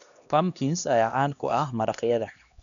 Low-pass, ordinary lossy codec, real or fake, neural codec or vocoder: 7.2 kHz; none; fake; codec, 16 kHz, 1 kbps, X-Codec, HuBERT features, trained on LibriSpeech